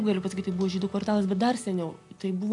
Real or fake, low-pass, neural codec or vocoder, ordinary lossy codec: real; 10.8 kHz; none; MP3, 64 kbps